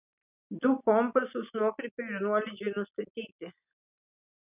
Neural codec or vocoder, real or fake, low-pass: none; real; 3.6 kHz